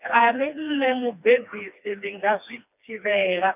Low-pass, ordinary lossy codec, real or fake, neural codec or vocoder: 3.6 kHz; none; fake; codec, 16 kHz, 2 kbps, FreqCodec, smaller model